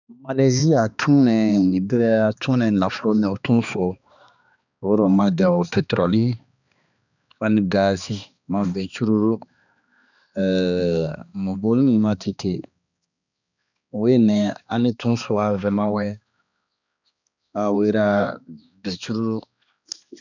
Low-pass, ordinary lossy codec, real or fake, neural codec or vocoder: 7.2 kHz; none; fake; codec, 16 kHz, 2 kbps, X-Codec, HuBERT features, trained on balanced general audio